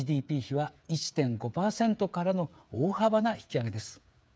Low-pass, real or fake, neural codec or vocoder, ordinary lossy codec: none; fake; codec, 16 kHz, 8 kbps, FreqCodec, smaller model; none